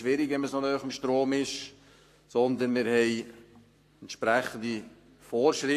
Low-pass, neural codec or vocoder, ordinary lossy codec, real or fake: 14.4 kHz; codec, 44.1 kHz, 7.8 kbps, Pupu-Codec; AAC, 64 kbps; fake